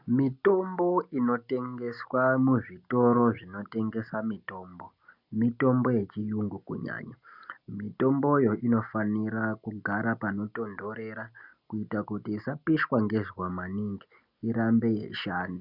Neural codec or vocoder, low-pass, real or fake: none; 5.4 kHz; real